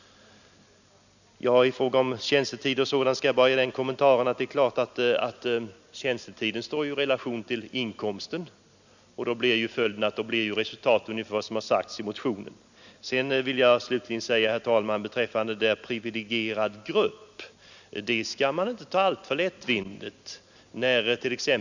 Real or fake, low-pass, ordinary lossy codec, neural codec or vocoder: real; 7.2 kHz; none; none